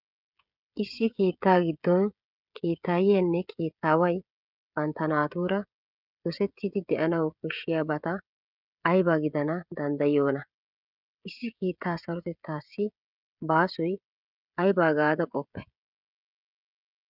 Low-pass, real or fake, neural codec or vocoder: 5.4 kHz; fake; codec, 16 kHz, 16 kbps, FreqCodec, smaller model